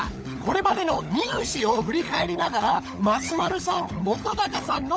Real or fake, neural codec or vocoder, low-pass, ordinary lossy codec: fake; codec, 16 kHz, 16 kbps, FunCodec, trained on LibriTTS, 50 frames a second; none; none